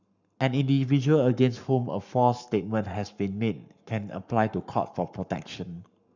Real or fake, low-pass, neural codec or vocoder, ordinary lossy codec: fake; 7.2 kHz; codec, 44.1 kHz, 7.8 kbps, Pupu-Codec; none